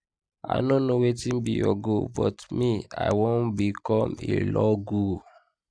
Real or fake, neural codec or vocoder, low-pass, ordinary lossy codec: real; none; 9.9 kHz; MP3, 96 kbps